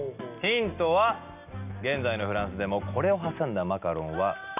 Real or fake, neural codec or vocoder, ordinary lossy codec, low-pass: real; none; none; 3.6 kHz